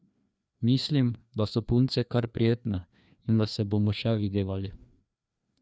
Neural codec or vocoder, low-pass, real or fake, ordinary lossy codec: codec, 16 kHz, 2 kbps, FreqCodec, larger model; none; fake; none